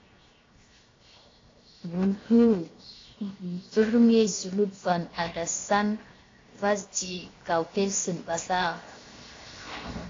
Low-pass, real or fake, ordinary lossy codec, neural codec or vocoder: 7.2 kHz; fake; AAC, 32 kbps; codec, 16 kHz, 0.7 kbps, FocalCodec